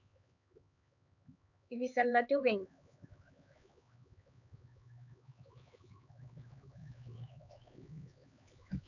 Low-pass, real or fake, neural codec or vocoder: 7.2 kHz; fake; codec, 16 kHz, 4 kbps, X-Codec, HuBERT features, trained on LibriSpeech